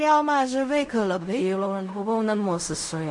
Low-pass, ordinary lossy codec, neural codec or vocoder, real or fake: 10.8 kHz; MP3, 48 kbps; codec, 16 kHz in and 24 kHz out, 0.4 kbps, LongCat-Audio-Codec, fine tuned four codebook decoder; fake